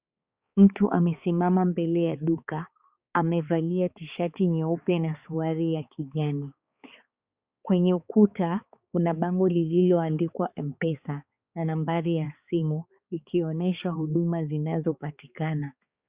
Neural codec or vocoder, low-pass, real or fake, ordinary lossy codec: codec, 16 kHz, 4 kbps, X-Codec, HuBERT features, trained on balanced general audio; 3.6 kHz; fake; Opus, 64 kbps